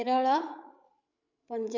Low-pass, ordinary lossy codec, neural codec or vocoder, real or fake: 7.2 kHz; none; codec, 16 kHz, 16 kbps, FreqCodec, larger model; fake